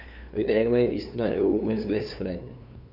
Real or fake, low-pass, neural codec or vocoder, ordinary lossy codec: fake; 5.4 kHz; codec, 16 kHz, 2 kbps, FunCodec, trained on LibriTTS, 25 frames a second; none